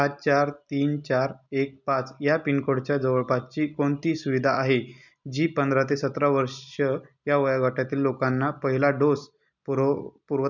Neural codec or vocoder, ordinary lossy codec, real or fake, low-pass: none; none; real; 7.2 kHz